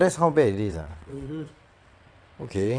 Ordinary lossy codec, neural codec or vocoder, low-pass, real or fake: none; vocoder, 22.05 kHz, 80 mel bands, WaveNeXt; 9.9 kHz; fake